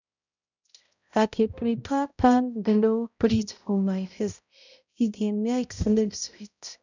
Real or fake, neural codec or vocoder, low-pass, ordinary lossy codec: fake; codec, 16 kHz, 0.5 kbps, X-Codec, HuBERT features, trained on balanced general audio; 7.2 kHz; none